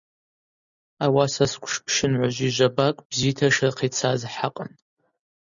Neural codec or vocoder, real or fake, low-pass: none; real; 7.2 kHz